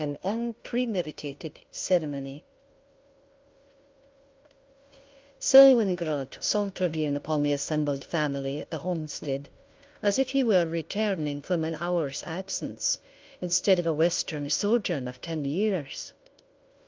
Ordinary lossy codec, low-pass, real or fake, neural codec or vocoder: Opus, 32 kbps; 7.2 kHz; fake; codec, 16 kHz, 0.5 kbps, FunCodec, trained on Chinese and English, 25 frames a second